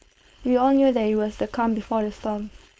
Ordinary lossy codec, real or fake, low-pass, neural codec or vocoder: none; fake; none; codec, 16 kHz, 4.8 kbps, FACodec